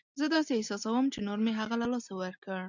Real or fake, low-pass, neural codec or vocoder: real; 7.2 kHz; none